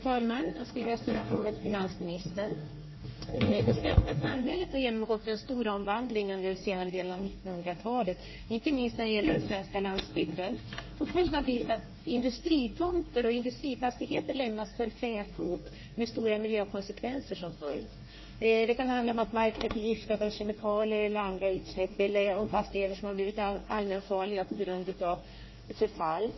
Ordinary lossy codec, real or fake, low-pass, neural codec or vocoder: MP3, 24 kbps; fake; 7.2 kHz; codec, 24 kHz, 1 kbps, SNAC